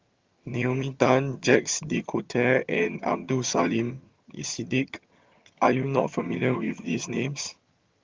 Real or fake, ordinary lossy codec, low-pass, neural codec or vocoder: fake; Opus, 32 kbps; 7.2 kHz; vocoder, 22.05 kHz, 80 mel bands, HiFi-GAN